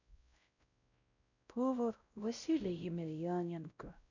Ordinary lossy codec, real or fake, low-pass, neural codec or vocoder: none; fake; 7.2 kHz; codec, 16 kHz, 0.5 kbps, X-Codec, WavLM features, trained on Multilingual LibriSpeech